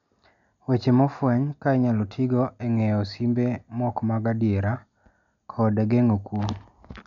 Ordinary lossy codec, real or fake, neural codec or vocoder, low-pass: MP3, 96 kbps; real; none; 7.2 kHz